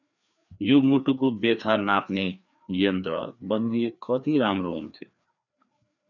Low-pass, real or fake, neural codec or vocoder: 7.2 kHz; fake; codec, 16 kHz, 2 kbps, FreqCodec, larger model